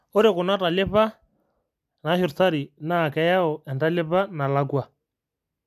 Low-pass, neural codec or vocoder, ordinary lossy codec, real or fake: 14.4 kHz; none; MP3, 96 kbps; real